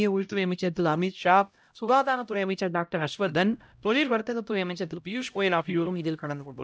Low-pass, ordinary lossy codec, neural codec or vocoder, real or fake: none; none; codec, 16 kHz, 0.5 kbps, X-Codec, HuBERT features, trained on LibriSpeech; fake